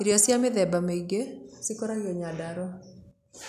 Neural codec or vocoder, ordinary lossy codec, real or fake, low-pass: none; none; real; 14.4 kHz